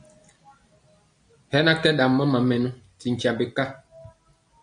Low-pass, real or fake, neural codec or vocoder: 9.9 kHz; real; none